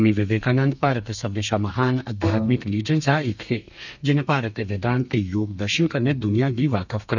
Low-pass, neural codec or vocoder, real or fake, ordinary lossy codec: 7.2 kHz; codec, 32 kHz, 1.9 kbps, SNAC; fake; none